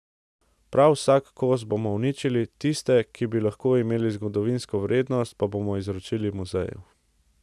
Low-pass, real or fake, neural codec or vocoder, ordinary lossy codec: none; real; none; none